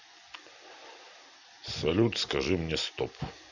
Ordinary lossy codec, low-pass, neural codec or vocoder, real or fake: none; 7.2 kHz; none; real